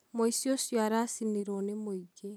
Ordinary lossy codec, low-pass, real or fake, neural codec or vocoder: none; none; real; none